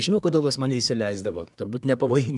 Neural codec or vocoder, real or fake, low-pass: codec, 24 kHz, 1 kbps, SNAC; fake; 10.8 kHz